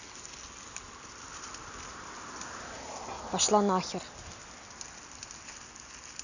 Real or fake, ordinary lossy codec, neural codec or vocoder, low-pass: real; none; none; 7.2 kHz